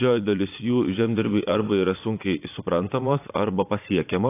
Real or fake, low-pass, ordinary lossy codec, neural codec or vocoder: fake; 3.6 kHz; AAC, 24 kbps; vocoder, 22.05 kHz, 80 mel bands, Vocos